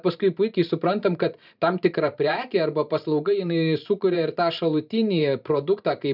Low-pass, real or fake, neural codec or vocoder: 5.4 kHz; fake; vocoder, 44.1 kHz, 128 mel bands every 512 samples, BigVGAN v2